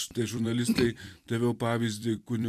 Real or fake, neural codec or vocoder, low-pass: fake; vocoder, 44.1 kHz, 128 mel bands every 256 samples, BigVGAN v2; 14.4 kHz